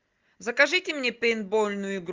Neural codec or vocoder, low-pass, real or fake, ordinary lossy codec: none; 7.2 kHz; real; Opus, 32 kbps